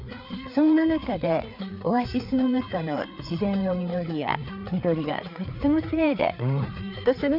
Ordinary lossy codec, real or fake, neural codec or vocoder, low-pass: none; fake; codec, 16 kHz, 4 kbps, FreqCodec, larger model; 5.4 kHz